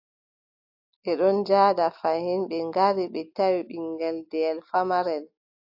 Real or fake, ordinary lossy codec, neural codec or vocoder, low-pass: real; AAC, 48 kbps; none; 5.4 kHz